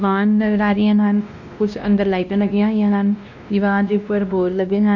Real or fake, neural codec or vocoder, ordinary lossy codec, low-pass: fake; codec, 16 kHz, 1 kbps, X-Codec, WavLM features, trained on Multilingual LibriSpeech; none; 7.2 kHz